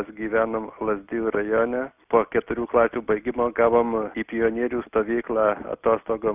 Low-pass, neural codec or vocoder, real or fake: 3.6 kHz; none; real